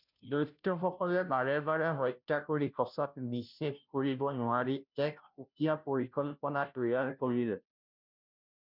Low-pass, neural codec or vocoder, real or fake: 5.4 kHz; codec, 16 kHz, 0.5 kbps, FunCodec, trained on Chinese and English, 25 frames a second; fake